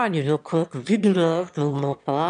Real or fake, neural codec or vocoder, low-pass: fake; autoencoder, 22.05 kHz, a latent of 192 numbers a frame, VITS, trained on one speaker; 9.9 kHz